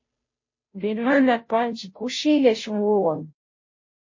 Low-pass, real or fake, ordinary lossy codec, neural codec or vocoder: 7.2 kHz; fake; MP3, 32 kbps; codec, 16 kHz, 0.5 kbps, FunCodec, trained on Chinese and English, 25 frames a second